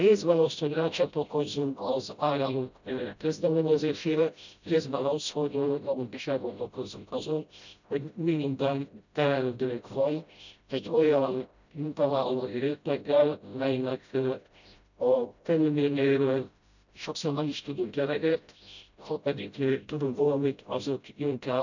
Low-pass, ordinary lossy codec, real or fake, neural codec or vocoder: 7.2 kHz; none; fake; codec, 16 kHz, 0.5 kbps, FreqCodec, smaller model